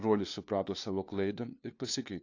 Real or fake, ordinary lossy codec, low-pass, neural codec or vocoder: fake; AAC, 48 kbps; 7.2 kHz; codec, 16 kHz, 2 kbps, FunCodec, trained on LibriTTS, 25 frames a second